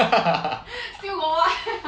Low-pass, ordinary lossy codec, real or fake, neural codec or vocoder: none; none; real; none